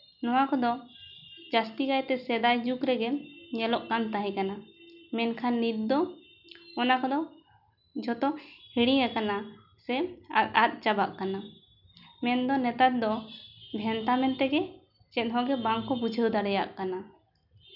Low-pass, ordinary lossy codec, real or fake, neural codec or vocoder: 5.4 kHz; none; real; none